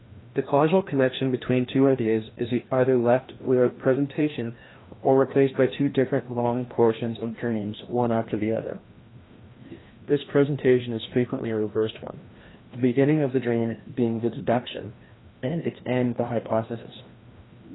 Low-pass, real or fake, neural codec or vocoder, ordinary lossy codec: 7.2 kHz; fake; codec, 16 kHz, 1 kbps, FreqCodec, larger model; AAC, 16 kbps